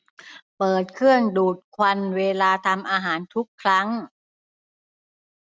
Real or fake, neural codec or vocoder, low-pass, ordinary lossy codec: real; none; none; none